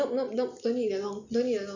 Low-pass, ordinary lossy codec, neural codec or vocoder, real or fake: 7.2 kHz; none; none; real